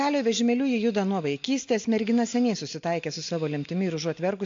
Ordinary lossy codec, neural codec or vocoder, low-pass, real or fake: AAC, 48 kbps; none; 7.2 kHz; real